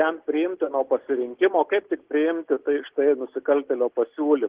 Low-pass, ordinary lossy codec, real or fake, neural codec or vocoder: 3.6 kHz; Opus, 16 kbps; real; none